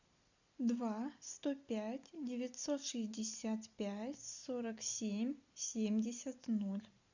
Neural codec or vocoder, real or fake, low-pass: none; real; 7.2 kHz